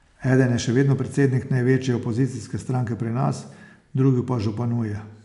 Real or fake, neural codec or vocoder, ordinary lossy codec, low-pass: real; none; none; 10.8 kHz